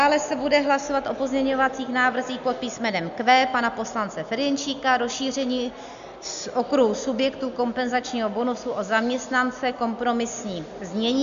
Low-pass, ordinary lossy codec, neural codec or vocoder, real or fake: 7.2 kHz; AAC, 96 kbps; none; real